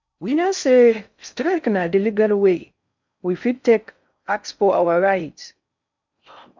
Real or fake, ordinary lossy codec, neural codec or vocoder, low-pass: fake; MP3, 64 kbps; codec, 16 kHz in and 24 kHz out, 0.6 kbps, FocalCodec, streaming, 4096 codes; 7.2 kHz